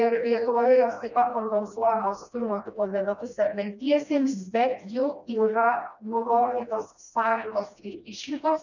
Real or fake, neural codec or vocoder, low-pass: fake; codec, 16 kHz, 1 kbps, FreqCodec, smaller model; 7.2 kHz